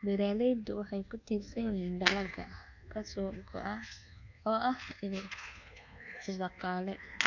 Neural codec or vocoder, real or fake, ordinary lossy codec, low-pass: codec, 24 kHz, 1.2 kbps, DualCodec; fake; none; 7.2 kHz